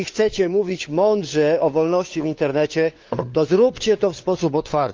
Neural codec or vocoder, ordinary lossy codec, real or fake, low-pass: codec, 16 kHz, 4 kbps, FunCodec, trained on LibriTTS, 50 frames a second; Opus, 32 kbps; fake; 7.2 kHz